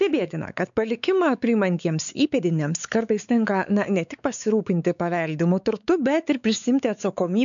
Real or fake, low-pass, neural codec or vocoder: fake; 7.2 kHz; codec, 16 kHz, 4 kbps, X-Codec, WavLM features, trained on Multilingual LibriSpeech